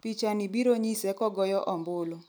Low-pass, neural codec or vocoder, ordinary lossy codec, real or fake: none; none; none; real